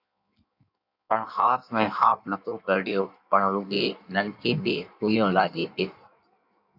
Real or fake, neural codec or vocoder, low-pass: fake; codec, 16 kHz in and 24 kHz out, 1.1 kbps, FireRedTTS-2 codec; 5.4 kHz